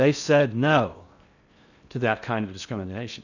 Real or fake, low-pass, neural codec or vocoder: fake; 7.2 kHz; codec, 16 kHz in and 24 kHz out, 0.6 kbps, FocalCodec, streaming, 4096 codes